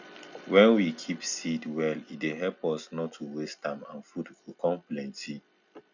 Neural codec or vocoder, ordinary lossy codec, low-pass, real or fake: none; none; 7.2 kHz; real